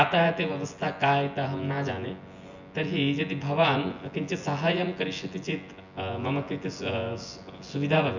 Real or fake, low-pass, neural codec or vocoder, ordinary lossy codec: fake; 7.2 kHz; vocoder, 24 kHz, 100 mel bands, Vocos; none